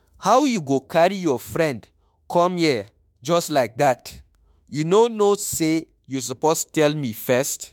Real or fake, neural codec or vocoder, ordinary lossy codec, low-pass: fake; autoencoder, 48 kHz, 32 numbers a frame, DAC-VAE, trained on Japanese speech; MP3, 96 kbps; 19.8 kHz